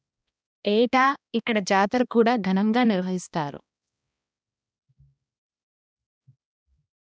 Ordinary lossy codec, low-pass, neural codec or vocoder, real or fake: none; none; codec, 16 kHz, 1 kbps, X-Codec, HuBERT features, trained on balanced general audio; fake